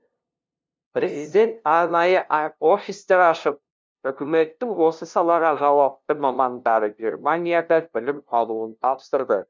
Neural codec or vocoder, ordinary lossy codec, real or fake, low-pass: codec, 16 kHz, 0.5 kbps, FunCodec, trained on LibriTTS, 25 frames a second; none; fake; none